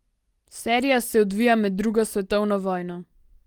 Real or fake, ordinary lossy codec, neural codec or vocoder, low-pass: real; Opus, 24 kbps; none; 19.8 kHz